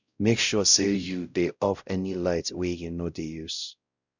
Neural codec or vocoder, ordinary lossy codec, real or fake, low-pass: codec, 16 kHz, 0.5 kbps, X-Codec, WavLM features, trained on Multilingual LibriSpeech; none; fake; 7.2 kHz